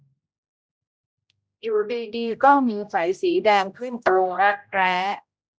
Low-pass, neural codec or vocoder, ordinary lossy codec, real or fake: none; codec, 16 kHz, 0.5 kbps, X-Codec, HuBERT features, trained on general audio; none; fake